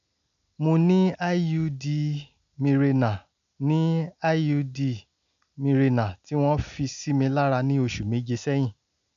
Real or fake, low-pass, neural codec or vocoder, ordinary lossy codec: real; 7.2 kHz; none; none